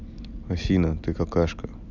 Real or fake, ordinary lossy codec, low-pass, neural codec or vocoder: real; none; 7.2 kHz; none